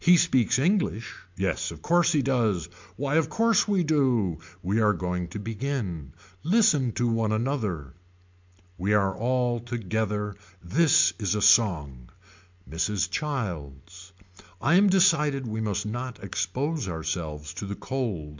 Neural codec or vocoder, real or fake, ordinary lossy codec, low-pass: none; real; MP3, 64 kbps; 7.2 kHz